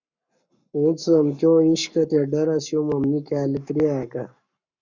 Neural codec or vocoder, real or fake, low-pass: codec, 44.1 kHz, 7.8 kbps, Pupu-Codec; fake; 7.2 kHz